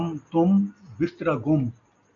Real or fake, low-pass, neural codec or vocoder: real; 7.2 kHz; none